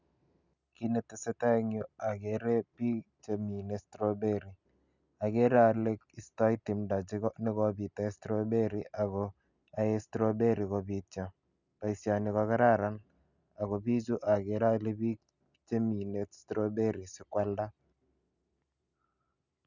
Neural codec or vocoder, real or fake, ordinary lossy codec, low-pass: none; real; none; 7.2 kHz